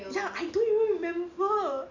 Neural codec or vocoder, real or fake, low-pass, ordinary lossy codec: none; real; 7.2 kHz; none